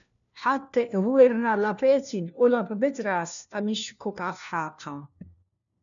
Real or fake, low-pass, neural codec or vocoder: fake; 7.2 kHz; codec, 16 kHz, 1 kbps, FunCodec, trained on LibriTTS, 50 frames a second